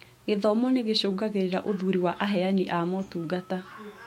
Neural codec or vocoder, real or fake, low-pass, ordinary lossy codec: autoencoder, 48 kHz, 128 numbers a frame, DAC-VAE, trained on Japanese speech; fake; 19.8 kHz; MP3, 64 kbps